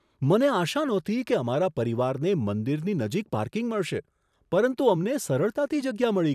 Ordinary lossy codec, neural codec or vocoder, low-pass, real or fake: none; vocoder, 44.1 kHz, 128 mel bands every 256 samples, BigVGAN v2; 14.4 kHz; fake